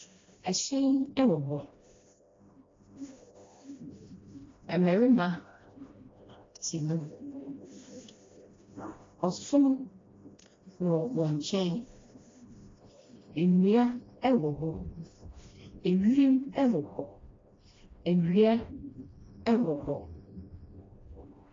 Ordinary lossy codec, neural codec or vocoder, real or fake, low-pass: AAC, 32 kbps; codec, 16 kHz, 1 kbps, FreqCodec, smaller model; fake; 7.2 kHz